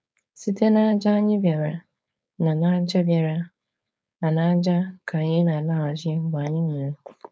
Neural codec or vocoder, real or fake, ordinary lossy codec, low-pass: codec, 16 kHz, 4.8 kbps, FACodec; fake; none; none